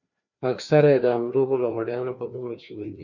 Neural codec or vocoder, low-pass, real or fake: codec, 16 kHz, 2 kbps, FreqCodec, larger model; 7.2 kHz; fake